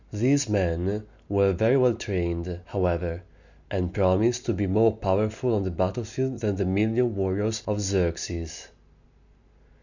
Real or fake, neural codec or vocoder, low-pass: real; none; 7.2 kHz